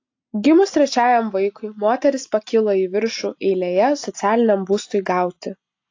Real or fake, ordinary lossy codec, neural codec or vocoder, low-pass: real; AAC, 48 kbps; none; 7.2 kHz